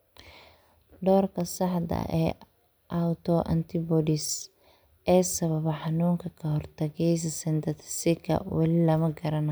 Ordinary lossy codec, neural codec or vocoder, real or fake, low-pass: none; none; real; none